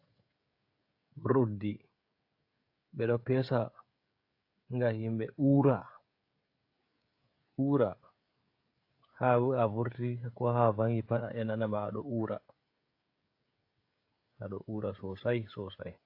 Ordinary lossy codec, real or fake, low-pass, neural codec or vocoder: AAC, 32 kbps; fake; 5.4 kHz; codec, 16 kHz, 16 kbps, FreqCodec, smaller model